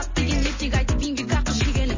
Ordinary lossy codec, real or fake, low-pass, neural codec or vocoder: MP3, 32 kbps; real; 7.2 kHz; none